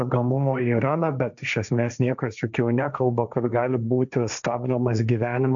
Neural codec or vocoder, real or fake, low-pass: codec, 16 kHz, 1.1 kbps, Voila-Tokenizer; fake; 7.2 kHz